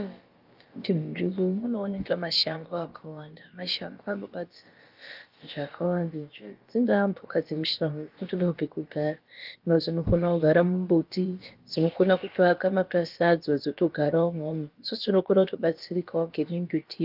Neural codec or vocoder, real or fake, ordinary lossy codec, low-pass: codec, 16 kHz, about 1 kbps, DyCAST, with the encoder's durations; fake; Opus, 32 kbps; 5.4 kHz